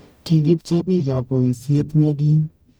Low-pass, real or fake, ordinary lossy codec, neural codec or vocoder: none; fake; none; codec, 44.1 kHz, 0.9 kbps, DAC